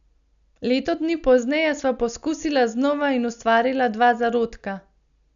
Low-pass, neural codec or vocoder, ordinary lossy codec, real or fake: 7.2 kHz; none; none; real